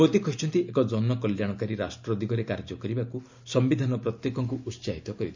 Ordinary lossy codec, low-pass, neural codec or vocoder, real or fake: MP3, 64 kbps; 7.2 kHz; none; real